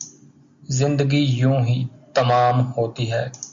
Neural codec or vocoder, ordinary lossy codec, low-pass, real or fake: none; MP3, 48 kbps; 7.2 kHz; real